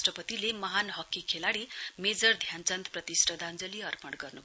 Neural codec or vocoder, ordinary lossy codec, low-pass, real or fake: none; none; none; real